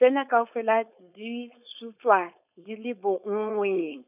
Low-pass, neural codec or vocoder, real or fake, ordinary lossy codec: 3.6 kHz; codec, 16 kHz, 4.8 kbps, FACodec; fake; none